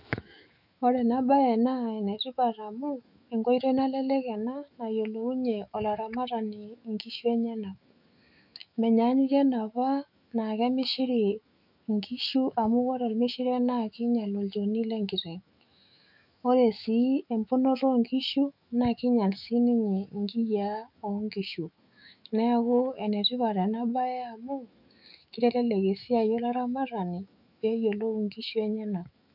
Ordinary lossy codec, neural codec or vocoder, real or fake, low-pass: none; autoencoder, 48 kHz, 128 numbers a frame, DAC-VAE, trained on Japanese speech; fake; 5.4 kHz